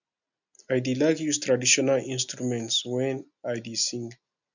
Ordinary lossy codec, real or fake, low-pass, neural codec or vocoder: none; real; 7.2 kHz; none